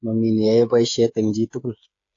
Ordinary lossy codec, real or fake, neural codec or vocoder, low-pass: AAC, 48 kbps; fake; codec, 16 kHz, 8 kbps, FreqCodec, smaller model; 7.2 kHz